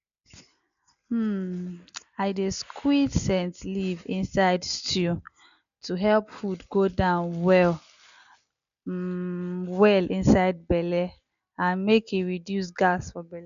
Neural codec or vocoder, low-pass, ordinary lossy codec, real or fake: none; 7.2 kHz; none; real